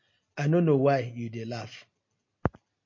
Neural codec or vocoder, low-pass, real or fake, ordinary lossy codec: none; 7.2 kHz; real; MP3, 32 kbps